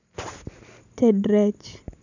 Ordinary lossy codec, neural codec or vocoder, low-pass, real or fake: none; none; 7.2 kHz; real